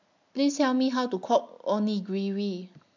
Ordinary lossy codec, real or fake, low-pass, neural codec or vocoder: MP3, 64 kbps; real; 7.2 kHz; none